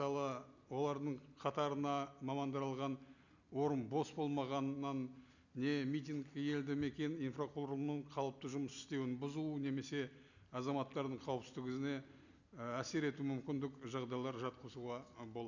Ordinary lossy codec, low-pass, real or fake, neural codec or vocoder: none; 7.2 kHz; real; none